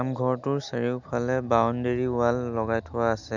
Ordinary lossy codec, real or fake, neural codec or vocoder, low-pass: none; real; none; 7.2 kHz